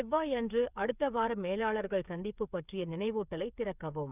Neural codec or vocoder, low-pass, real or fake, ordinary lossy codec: codec, 16 kHz, 8 kbps, FreqCodec, smaller model; 3.6 kHz; fake; none